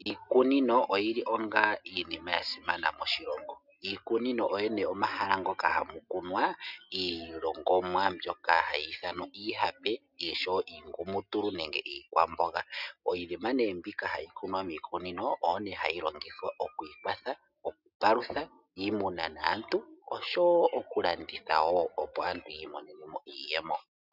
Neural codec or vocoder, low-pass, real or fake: none; 5.4 kHz; real